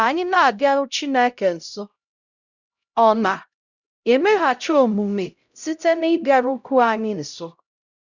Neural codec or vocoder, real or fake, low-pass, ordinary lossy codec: codec, 16 kHz, 0.5 kbps, X-Codec, HuBERT features, trained on LibriSpeech; fake; 7.2 kHz; none